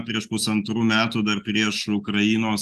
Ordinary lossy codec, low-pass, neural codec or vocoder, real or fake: Opus, 32 kbps; 14.4 kHz; none; real